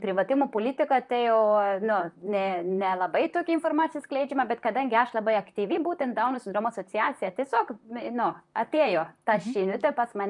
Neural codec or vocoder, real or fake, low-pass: vocoder, 44.1 kHz, 128 mel bands, Pupu-Vocoder; fake; 10.8 kHz